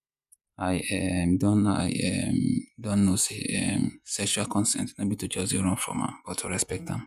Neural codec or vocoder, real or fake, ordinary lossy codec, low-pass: vocoder, 48 kHz, 128 mel bands, Vocos; fake; none; 14.4 kHz